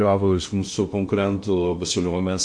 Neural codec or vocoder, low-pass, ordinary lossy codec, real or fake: codec, 16 kHz in and 24 kHz out, 0.6 kbps, FocalCodec, streaming, 2048 codes; 9.9 kHz; MP3, 48 kbps; fake